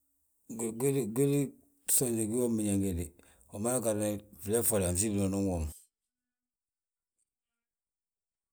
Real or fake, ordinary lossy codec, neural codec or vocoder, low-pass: real; none; none; none